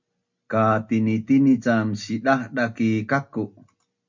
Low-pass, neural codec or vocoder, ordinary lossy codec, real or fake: 7.2 kHz; none; MP3, 64 kbps; real